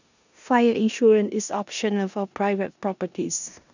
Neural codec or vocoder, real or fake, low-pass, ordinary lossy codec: codec, 16 kHz in and 24 kHz out, 0.9 kbps, LongCat-Audio-Codec, four codebook decoder; fake; 7.2 kHz; none